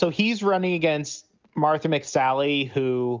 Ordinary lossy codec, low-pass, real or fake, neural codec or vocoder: Opus, 24 kbps; 7.2 kHz; real; none